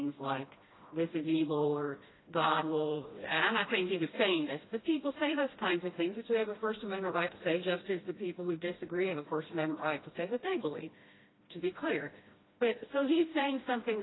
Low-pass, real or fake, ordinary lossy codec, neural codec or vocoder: 7.2 kHz; fake; AAC, 16 kbps; codec, 16 kHz, 1 kbps, FreqCodec, smaller model